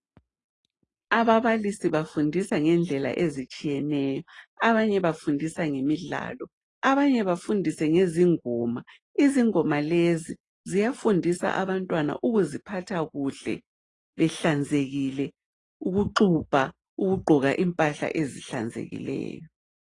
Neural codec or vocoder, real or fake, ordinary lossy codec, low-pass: none; real; AAC, 32 kbps; 10.8 kHz